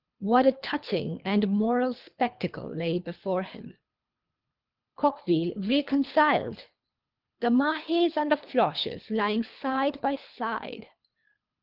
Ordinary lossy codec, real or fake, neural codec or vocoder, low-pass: Opus, 24 kbps; fake; codec, 24 kHz, 3 kbps, HILCodec; 5.4 kHz